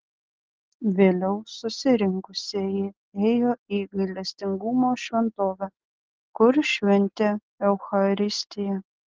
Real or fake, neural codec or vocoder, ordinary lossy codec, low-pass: real; none; Opus, 16 kbps; 7.2 kHz